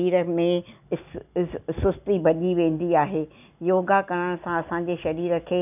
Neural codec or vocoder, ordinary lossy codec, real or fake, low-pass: none; none; real; 3.6 kHz